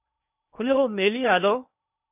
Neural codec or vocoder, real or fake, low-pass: codec, 16 kHz in and 24 kHz out, 0.8 kbps, FocalCodec, streaming, 65536 codes; fake; 3.6 kHz